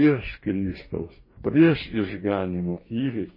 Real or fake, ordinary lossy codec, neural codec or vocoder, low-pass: fake; MP3, 24 kbps; codec, 44.1 kHz, 2.6 kbps, DAC; 5.4 kHz